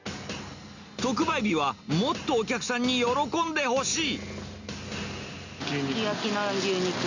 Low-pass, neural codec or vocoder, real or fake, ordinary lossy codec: 7.2 kHz; none; real; Opus, 64 kbps